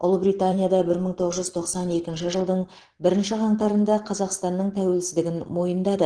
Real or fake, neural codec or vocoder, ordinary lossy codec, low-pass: fake; vocoder, 44.1 kHz, 128 mel bands every 512 samples, BigVGAN v2; Opus, 16 kbps; 9.9 kHz